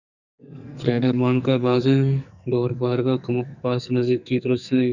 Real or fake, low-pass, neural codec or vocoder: fake; 7.2 kHz; codec, 44.1 kHz, 2.6 kbps, SNAC